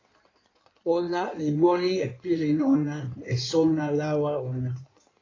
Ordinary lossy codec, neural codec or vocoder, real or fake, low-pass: AAC, 32 kbps; codec, 16 kHz in and 24 kHz out, 1.1 kbps, FireRedTTS-2 codec; fake; 7.2 kHz